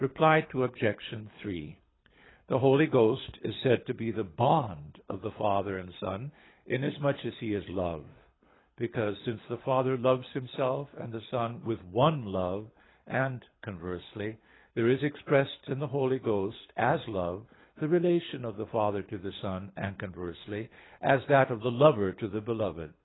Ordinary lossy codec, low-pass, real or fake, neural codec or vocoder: AAC, 16 kbps; 7.2 kHz; fake; codec, 24 kHz, 6 kbps, HILCodec